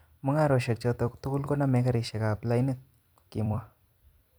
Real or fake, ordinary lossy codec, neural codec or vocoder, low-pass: fake; none; vocoder, 44.1 kHz, 128 mel bands every 256 samples, BigVGAN v2; none